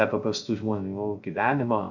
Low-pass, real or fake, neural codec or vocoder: 7.2 kHz; fake; codec, 16 kHz, 0.3 kbps, FocalCodec